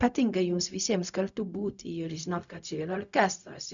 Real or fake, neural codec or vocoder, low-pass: fake; codec, 16 kHz, 0.4 kbps, LongCat-Audio-Codec; 7.2 kHz